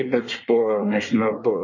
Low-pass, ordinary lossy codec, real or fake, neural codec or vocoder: 7.2 kHz; MP3, 32 kbps; fake; codec, 24 kHz, 1 kbps, SNAC